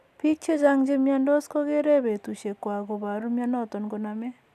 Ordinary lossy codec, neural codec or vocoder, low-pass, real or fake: none; none; 14.4 kHz; real